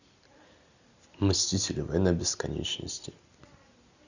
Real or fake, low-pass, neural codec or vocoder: real; 7.2 kHz; none